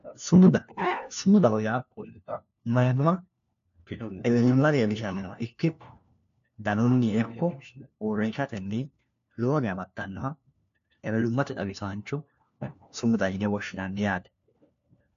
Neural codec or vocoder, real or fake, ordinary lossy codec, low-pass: codec, 16 kHz, 1 kbps, FunCodec, trained on LibriTTS, 50 frames a second; fake; AAC, 96 kbps; 7.2 kHz